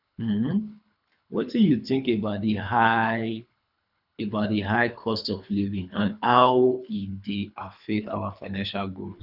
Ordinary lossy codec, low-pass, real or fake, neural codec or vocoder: none; 5.4 kHz; fake; codec, 24 kHz, 3 kbps, HILCodec